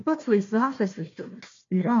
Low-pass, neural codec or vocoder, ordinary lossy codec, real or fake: 7.2 kHz; codec, 16 kHz, 1 kbps, FunCodec, trained on Chinese and English, 50 frames a second; AAC, 48 kbps; fake